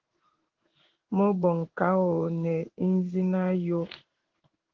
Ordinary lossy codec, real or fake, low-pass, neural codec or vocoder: Opus, 16 kbps; fake; 7.2 kHz; codec, 16 kHz in and 24 kHz out, 1 kbps, XY-Tokenizer